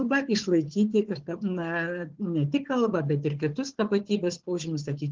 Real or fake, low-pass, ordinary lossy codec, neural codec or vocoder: fake; 7.2 kHz; Opus, 16 kbps; codec, 16 kHz, 4 kbps, FunCodec, trained on Chinese and English, 50 frames a second